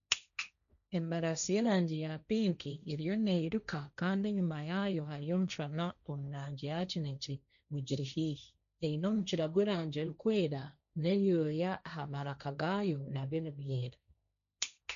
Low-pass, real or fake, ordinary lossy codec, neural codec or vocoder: 7.2 kHz; fake; none; codec, 16 kHz, 1.1 kbps, Voila-Tokenizer